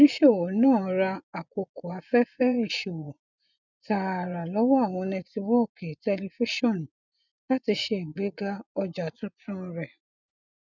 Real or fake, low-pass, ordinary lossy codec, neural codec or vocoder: fake; 7.2 kHz; none; vocoder, 24 kHz, 100 mel bands, Vocos